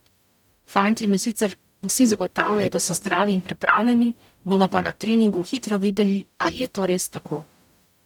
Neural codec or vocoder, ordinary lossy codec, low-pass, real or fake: codec, 44.1 kHz, 0.9 kbps, DAC; none; 19.8 kHz; fake